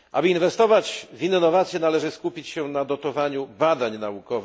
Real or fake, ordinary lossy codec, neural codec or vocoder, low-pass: real; none; none; none